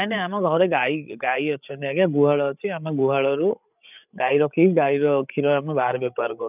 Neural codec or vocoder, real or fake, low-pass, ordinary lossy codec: codec, 16 kHz, 8 kbps, FreqCodec, larger model; fake; 3.6 kHz; none